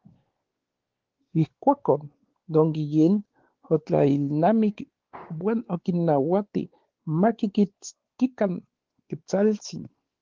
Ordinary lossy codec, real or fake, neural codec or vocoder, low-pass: Opus, 16 kbps; fake; codec, 16 kHz, 4 kbps, X-Codec, WavLM features, trained on Multilingual LibriSpeech; 7.2 kHz